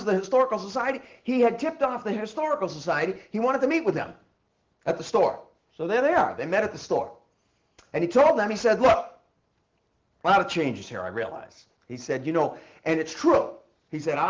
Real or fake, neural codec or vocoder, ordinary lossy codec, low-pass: real; none; Opus, 16 kbps; 7.2 kHz